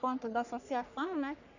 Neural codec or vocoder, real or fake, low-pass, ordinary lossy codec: codec, 44.1 kHz, 3.4 kbps, Pupu-Codec; fake; 7.2 kHz; none